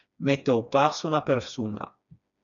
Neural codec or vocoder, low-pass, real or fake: codec, 16 kHz, 2 kbps, FreqCodec, smaller model; 7.2 kHz; fake